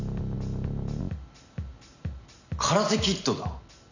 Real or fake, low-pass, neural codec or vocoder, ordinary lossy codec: real; 7.2 kHz; none; none